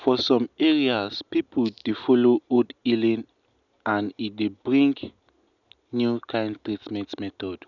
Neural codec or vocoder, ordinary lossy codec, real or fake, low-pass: none; none; real; 7.2 kHz